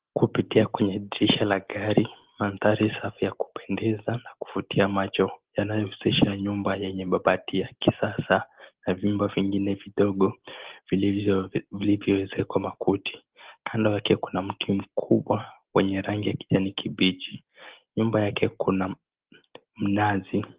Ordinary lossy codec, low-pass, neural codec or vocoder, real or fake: Opus, 16 kbps; 3.6 kHz; none; real